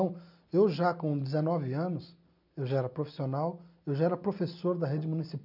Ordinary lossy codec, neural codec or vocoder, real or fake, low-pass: none; none; real; 5.4 kHz